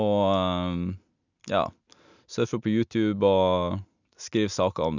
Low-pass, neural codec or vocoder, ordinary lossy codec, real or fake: 7.2 kHz; none; none; real